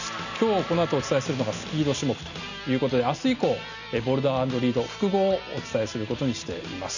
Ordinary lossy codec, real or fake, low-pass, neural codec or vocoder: none; real; 7.2 kHz; none